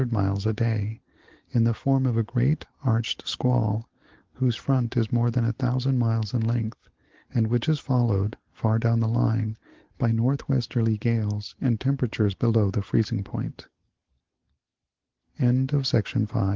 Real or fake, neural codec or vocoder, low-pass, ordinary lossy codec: real; none; 7.2 kHz; Opus, 16 kbps